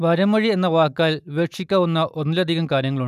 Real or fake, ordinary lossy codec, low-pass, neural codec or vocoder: real; none; 14.4 kHz; none